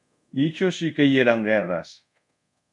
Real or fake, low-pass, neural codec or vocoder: fake; 10.8 kHz; codec, 24 kHz, 0.5 kbps, DualCodec